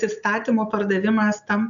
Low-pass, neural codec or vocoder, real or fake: 7.2 kHz; none; real